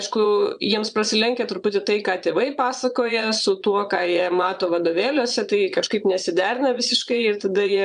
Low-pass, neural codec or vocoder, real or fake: 9.9 kHz; vocoder, 22.05 kHz, 80 mel bands, Vocos; fake